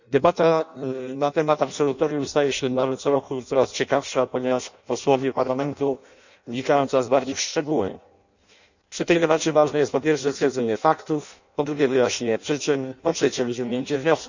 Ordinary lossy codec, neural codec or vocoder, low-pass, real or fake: none; codec, 16 kHz in and 24 kHz out, 0.6 kbps, FireRedTTS-2 codec; 7.2 kHz; fake